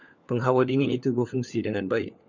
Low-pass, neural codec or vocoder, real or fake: 7.2 kHz; codec, 16 kHz, 4 kbps, FunCodec, trained on LibriTTS, 50 frames a second; fake